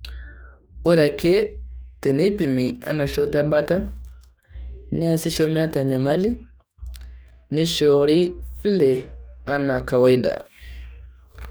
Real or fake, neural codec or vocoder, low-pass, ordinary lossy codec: fake; codec, 44.1 kHz, 2.6 kbps, DAC; none; none